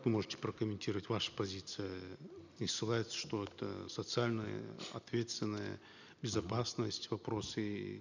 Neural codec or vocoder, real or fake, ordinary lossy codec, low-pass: none; real; none; 7.2 kHz